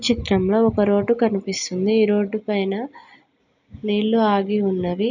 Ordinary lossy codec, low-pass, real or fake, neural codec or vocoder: none; 7.2 kHz; real; none